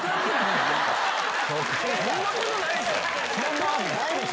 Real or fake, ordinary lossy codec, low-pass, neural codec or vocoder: real; none; none; none